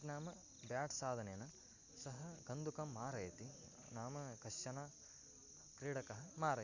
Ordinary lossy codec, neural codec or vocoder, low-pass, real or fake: Opus, 64 kbps; none; 7.2 kHz; real